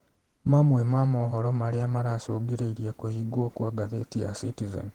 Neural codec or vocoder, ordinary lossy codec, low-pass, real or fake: vocoder, 48 kHz, 128 mel bands, Vocos; Opus, 16 kbps; 19.8 kHz; fake